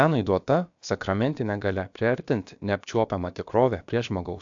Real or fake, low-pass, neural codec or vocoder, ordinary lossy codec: fake; 7.2 kHz; codec, 16 kHz, about 1 kbps, DyCAST, with the encoder's durations; AAC, 64 kbps